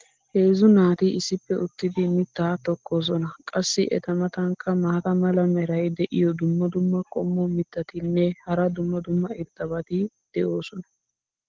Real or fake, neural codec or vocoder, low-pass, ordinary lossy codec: real; none; 7.2 kHz; Opus, 16 kbps